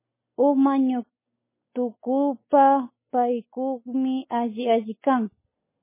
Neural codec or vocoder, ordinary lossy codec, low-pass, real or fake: none; MP3, 16 kbps; 3.6 kHz; real